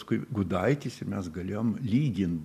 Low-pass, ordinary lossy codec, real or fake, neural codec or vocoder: 14.4 kHz; MP3, 96 kbps; real; none